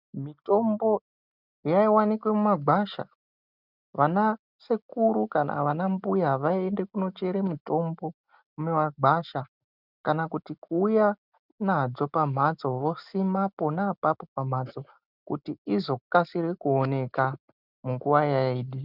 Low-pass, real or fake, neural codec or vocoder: 5.4 kHz; real; none